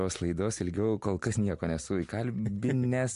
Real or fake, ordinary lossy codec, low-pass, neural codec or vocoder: real; MP3, 64 kbps; 10.8 kHz; none